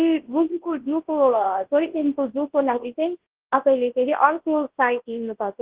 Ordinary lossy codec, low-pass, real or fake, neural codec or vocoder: Opus, 16 kbps; 3.6 kHz; fake; codec, 24 kHz, 0.9 kbps, WavTokenizer, large speech release